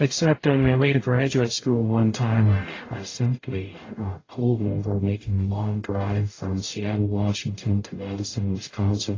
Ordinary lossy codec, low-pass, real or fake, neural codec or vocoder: AAC, 32 kbps; 7.2 kHz; fake; codec, 44.1 kHz, 0.9 kbps, DAC